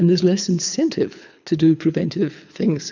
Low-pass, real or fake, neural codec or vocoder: 7.2 kHz; fake; codec, 24 kHz, 6 kbps, HILCodec